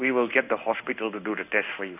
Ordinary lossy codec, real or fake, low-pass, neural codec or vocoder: none; fake; 3.6 kHz; codec, 16 kHz in and 24 kHz out, 1 kbps, XY-Tokenizer